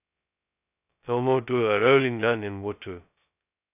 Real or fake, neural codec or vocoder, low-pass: fake; codec, 16 kHz, 0.2 kbps, FocalCodec; 3.6 kHz